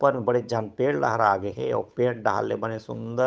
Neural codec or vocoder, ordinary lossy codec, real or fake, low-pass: codec, 16 kHz, 8 kbps, FunCodec, trained on Chinese and English, 25 frames a second; none; fake; none